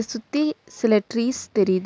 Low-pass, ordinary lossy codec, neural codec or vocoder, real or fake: none; none; none; real